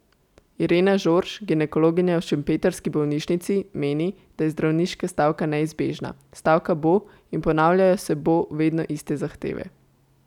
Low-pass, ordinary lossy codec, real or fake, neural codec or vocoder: 19.8 kHz; none; real; none